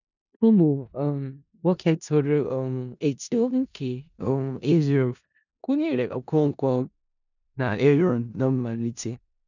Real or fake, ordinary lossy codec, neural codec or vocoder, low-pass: fake; none; codec, 16 kHz in and 24 kHz out, 0.4 kbps, LongCat-Audio-Codec, four codebook decoder; 7.2 kHz